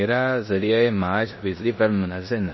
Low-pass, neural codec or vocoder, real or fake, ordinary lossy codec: 7.2 kHz; codec, 16 kHz in and 24 kHz out, 0.9 kbps, LongCat-Audio-Codec, four codebook decoder; fake; MP3, 24 kbps